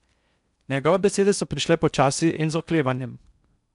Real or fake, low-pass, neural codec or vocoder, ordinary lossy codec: fake; 10.8 kHz; codec, 16 kHz in and 24 kHz out, 0.6 kbps, FocalCodec, streaming, 4096 codes; none